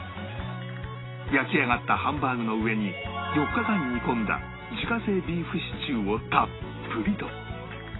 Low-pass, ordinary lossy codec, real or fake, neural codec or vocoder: 7.2 kHz; AAC, 16 kbps; real; none